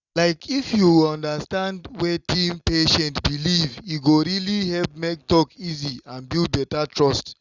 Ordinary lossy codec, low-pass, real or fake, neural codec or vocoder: Opus, 64 kbps; 7.2 kHz; real; none